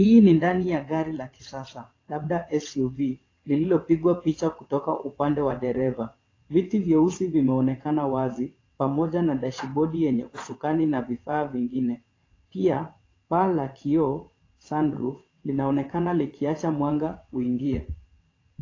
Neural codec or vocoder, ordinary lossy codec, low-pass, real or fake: vocoder, 22.05 kHz, 80 mel bands, WaveNeXt; AAC, 32 kbps; 7.2 kHz; fake